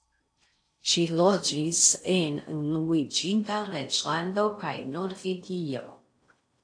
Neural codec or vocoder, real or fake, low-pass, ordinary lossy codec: codec, 16 kHz in and 24 kHz out, 0.6 kbps, FocalCodec, streaming, 4096 codes; fake; 9.9 kHz; AAC, 48 kbps